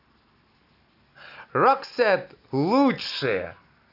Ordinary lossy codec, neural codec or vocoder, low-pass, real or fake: none; none; 5.4 kHz; real